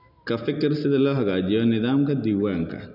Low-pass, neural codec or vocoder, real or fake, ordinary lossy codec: 5.4 kHz; none; real; none